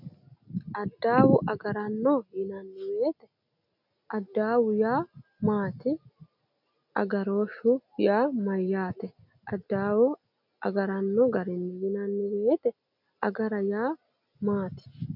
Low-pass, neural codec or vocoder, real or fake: 5.4 kHz; none; real